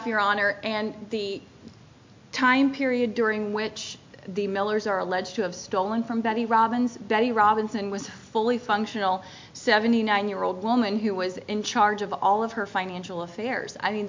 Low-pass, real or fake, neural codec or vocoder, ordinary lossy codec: 7.2 kHz; real; none; MP3, 48 kbps